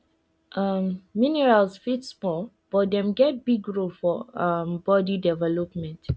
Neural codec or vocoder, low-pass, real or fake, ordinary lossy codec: none; none; real; none